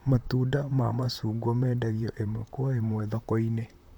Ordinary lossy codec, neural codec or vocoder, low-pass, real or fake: none; vocoder, 44.1 kHz, 128 mel bands, Pupu-Vocoder; 19.8 kHz; fake